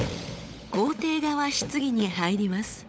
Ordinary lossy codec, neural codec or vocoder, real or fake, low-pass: none; codec, 16 kHz, 16 kbps, FunCodec, trained on LibriTTS, 50 frames a second; fake; none